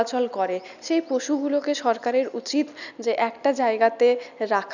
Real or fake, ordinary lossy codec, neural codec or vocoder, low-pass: real; none; none; 7.2 kHz